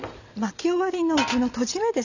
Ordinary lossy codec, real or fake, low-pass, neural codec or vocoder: none; real; 7.2 kHz; none